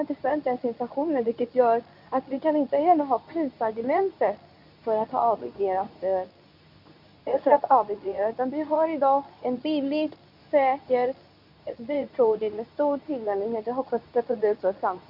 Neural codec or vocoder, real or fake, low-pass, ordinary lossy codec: codec, 24 kHz, 0.9 kbps, WavTokenizer, medium speech release version 2; fake; 5.4 kHz; none